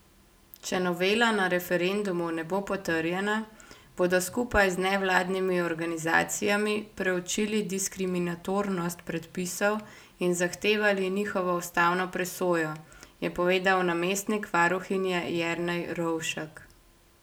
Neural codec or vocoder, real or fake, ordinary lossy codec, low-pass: none; real; none; none